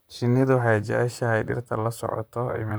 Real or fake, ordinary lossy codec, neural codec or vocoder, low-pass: fake; none; vocoder, 44.1 kHz, 128 mel bands, Pupu-Vocoder; none